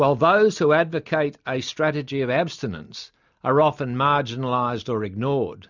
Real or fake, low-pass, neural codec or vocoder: real; 7.2 kHz; none